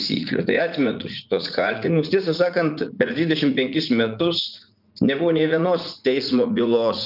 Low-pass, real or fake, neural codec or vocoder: 5.4 kHz; fake; vocoder, 44.1 kHz, 80 mel bands, Vocos